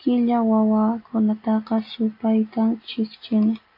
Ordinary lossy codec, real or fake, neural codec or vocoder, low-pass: AAC, 32 kbps; real; none; 5.4 kHz